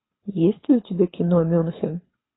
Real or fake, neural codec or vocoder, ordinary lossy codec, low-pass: fake; codec, 24 kHz, 6 kbps, HILCodec; AAC, 16 kbps; 7.2 kHz